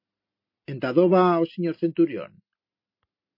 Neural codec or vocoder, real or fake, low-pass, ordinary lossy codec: none; real; 5.4 kHz; MP3, 32 kbps